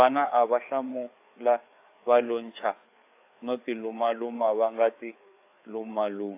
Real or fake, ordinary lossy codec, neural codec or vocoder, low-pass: fake; AAC, 24 kbps; codec, 16 kHz, 4 kbps, FreqCodec, larger model; 3.6 kHz